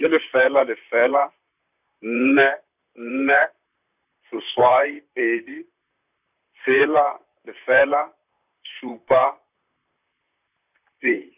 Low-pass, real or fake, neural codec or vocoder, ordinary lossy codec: 3.6 kHz; fake; vocoder, 44.1 kHz, 128 mel bands, Pupu-Vocoder; none